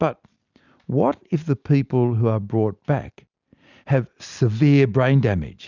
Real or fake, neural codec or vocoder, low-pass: real; none; 7.2 kHz